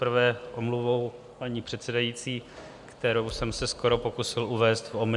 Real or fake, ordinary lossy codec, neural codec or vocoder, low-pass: real; MP3, 96 kbps; none; 10.8 kHz